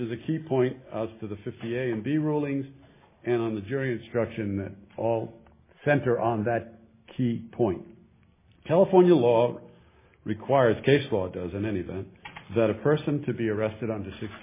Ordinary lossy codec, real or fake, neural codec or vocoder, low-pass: MP3, 16 kbps; real; none; 3.6 kHz